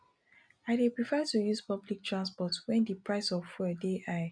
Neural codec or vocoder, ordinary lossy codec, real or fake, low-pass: none; none; real; 9.9 kHz